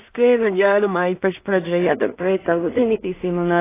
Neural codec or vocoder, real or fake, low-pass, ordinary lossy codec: codec, 16 kHz in and 24 kHz out, 0.4 kbps, LongCat-Audio-Codec, two codebook decoder; fake; 3.6 kHz; AAC, 16 kbps